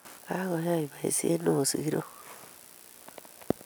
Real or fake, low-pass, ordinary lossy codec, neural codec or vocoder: real; none; none; none